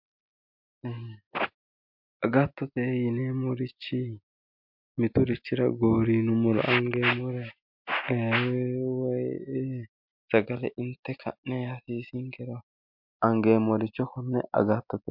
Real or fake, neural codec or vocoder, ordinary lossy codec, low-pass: real; none; MP3, 48 kbps; 5.4 kHz